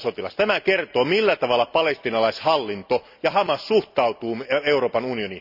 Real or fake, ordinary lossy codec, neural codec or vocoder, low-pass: real; none; none; 5.4 kHz